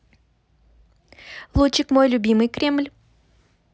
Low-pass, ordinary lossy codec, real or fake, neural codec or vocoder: none; none; real; none